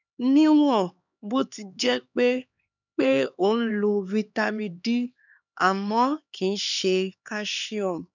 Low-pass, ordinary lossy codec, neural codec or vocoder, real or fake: 7.2 kHz; none; codec, 16 kHz, 2 kbps, X-Codec, HuBERT features, trained on LibriSpeech; fake